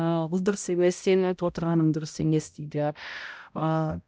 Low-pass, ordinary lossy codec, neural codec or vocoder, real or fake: none; none; codec, 16 kHz, 0.5 kbps, X-Codec, HuBERT features, trained on balanced general audio; fake